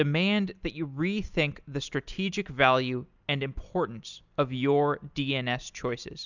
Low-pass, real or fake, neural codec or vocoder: 7.2 kHz; real; none